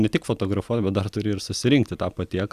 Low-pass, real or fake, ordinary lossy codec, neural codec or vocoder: 14.4 kHz; real; Opus, 64 kbps; none